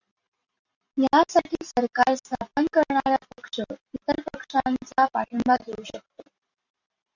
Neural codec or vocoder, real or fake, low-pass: none; real; 7.2 kHz